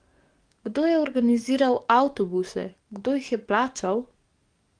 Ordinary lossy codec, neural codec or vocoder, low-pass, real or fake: Opus, 24 kbps; codec, 44.1 kHz, 7.8 kbps, DAC; 9.9 kHz; fake